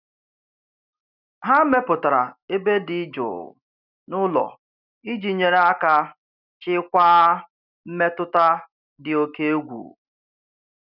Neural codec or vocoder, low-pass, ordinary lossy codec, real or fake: none; 5.4 kHz; none; real